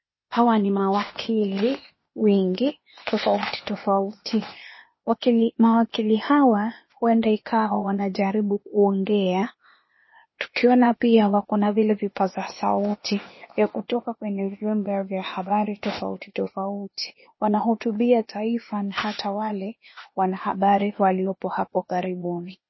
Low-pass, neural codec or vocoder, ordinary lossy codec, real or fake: 7.2 kHz; codec, 16 kHz, 0.8 kbps, ZipCodec; MP3, 24 kbps; fake